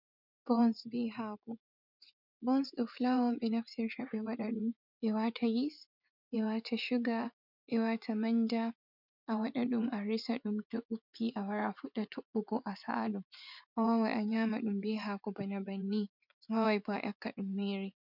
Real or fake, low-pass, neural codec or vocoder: fake; 5.4 kHz; vocoder, 24 kHz, 100 mel bands, Vocos